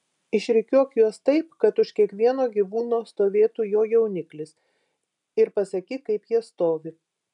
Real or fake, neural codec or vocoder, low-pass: real; none; 10.8 kHz